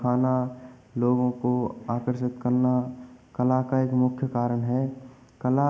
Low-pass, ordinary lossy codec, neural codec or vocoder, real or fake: none; none; none; real